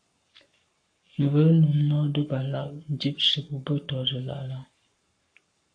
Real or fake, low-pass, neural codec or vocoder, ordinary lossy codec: fake; 9.9 kHz; codec, 44.1 kHz, 7.8 kbps, Pupu-Codec; AAC, 48 kbps